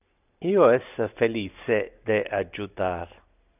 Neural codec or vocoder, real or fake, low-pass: none; real; 3.6 kHz